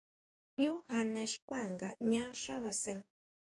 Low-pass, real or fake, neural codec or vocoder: 10.8 kHz; fake; codec, 44.1 kHz, 2.6 kbps, DAC